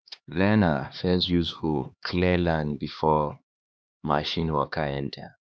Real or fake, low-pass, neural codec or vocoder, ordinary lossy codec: fake; none; codec, 16 kHz, 2 kbps, X-Codec, HuBERT features, trained on LibriSpeech; none